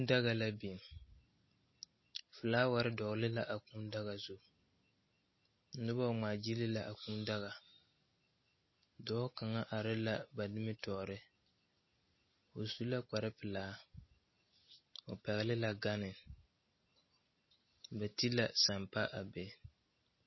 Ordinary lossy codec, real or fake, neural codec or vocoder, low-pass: MP3, 24 kbps; real; none; 7.2 kHz